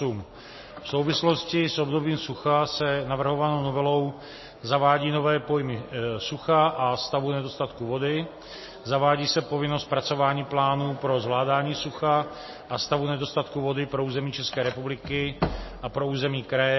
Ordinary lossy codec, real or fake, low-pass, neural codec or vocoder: MP3, 24 kbps; real; 7.2 kHz; none